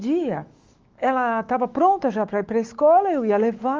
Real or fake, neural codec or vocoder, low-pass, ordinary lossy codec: fake; autoencoder, 48 kHz, 128 numbers a frame, DAC-VAE, trained on Japanese speech; 7.2 kHz; Opus, 32 kbps